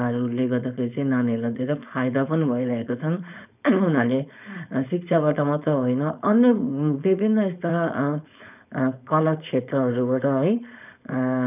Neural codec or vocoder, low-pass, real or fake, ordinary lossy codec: codec, 16 kHz, 4.8 kbps, FACodec; 3.6 kHz; fake; none